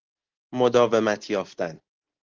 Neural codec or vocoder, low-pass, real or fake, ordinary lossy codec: none; 7.2 kHz; real; Opus, 16 kbps